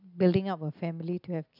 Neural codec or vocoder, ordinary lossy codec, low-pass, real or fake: none; none; 5.4 kHz; real